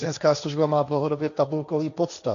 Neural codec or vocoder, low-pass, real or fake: codec, 16 kHz, 1.1 kbps, Voila-Tokenizer; 7.2 kHz; fake